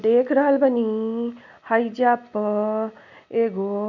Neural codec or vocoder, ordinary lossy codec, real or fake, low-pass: none; none; real; 7.2 kHz